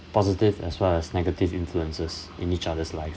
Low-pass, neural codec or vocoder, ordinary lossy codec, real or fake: none; none; none; real